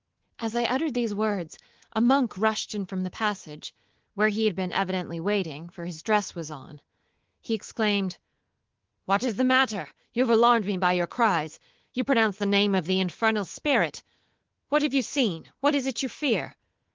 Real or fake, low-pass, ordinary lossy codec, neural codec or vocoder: fake; 7.2 kHz; Opus, 16 kbps; codec, 24 kHz, 3.1 kbps, DualCodec